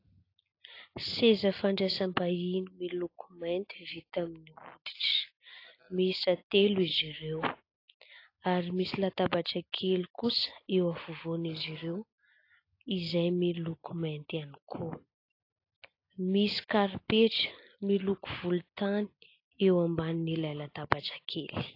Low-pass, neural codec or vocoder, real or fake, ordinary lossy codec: 5.4 kHz; none; real; AAC, 32 kbps